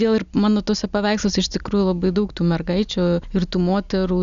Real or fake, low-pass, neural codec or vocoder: real; 7.2 kHz; none